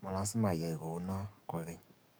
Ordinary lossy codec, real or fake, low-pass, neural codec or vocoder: none; fake; none; codec, 44.1 kHz, 7.8 kbps, DAC